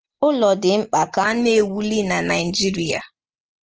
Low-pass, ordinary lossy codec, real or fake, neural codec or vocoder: 7.2 kHz; Opus, 16 kbps; real; none